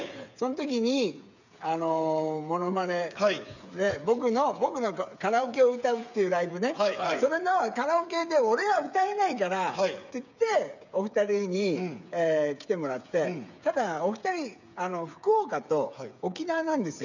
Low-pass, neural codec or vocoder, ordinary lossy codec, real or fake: 7.2 kHz; codec, 16 kHz, 8 kbps, FreqCodec, smaller model; none; fake